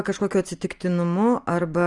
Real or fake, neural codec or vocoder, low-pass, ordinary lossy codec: real; none; 10.8 kHz; Opus, 32 kbps